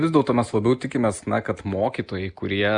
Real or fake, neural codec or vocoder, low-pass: real; none; 9.9 kHz